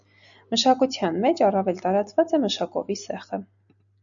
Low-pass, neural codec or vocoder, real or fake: 7.2 kHz; none; real